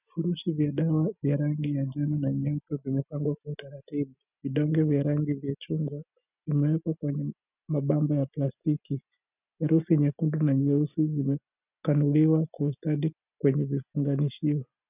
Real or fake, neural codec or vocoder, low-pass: real; none; 3.6 kHz